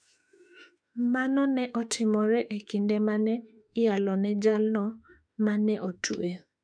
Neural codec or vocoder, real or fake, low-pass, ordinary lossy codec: autoencoder, 48 kHz, 32 numbers a frame, DAC-VAE, trained on Japanese speech; fake; 9.9 kHz; none